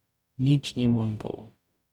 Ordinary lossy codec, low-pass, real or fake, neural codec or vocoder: none; 19.8 kHz; fake; codec, 44.1 kHz, 0.9 kbps, DAC